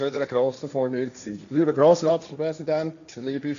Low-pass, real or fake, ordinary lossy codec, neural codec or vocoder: 7.2 kHz; fake; none; codec, 16 kHz, 1.1 kbps, Voila-Tokenizer